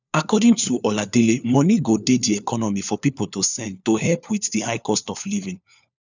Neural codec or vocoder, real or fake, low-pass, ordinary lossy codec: codec, 16 kHz, 4 kbps, FunCodec, trained on LibriTTS, 50 frames a second; fake; 7.2 kHz; none